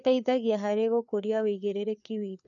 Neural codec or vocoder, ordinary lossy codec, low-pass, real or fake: codec, 16 kHz, 4 kbps, FreqCodec, larger model; none; 7.2 kHz; fake